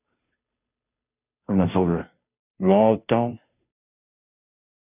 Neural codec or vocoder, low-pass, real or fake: codec, 16 kHz, 0.5 kbps, FunCodec, trained on Chinese and English, 25 frames a second; 3.6 kHz; fake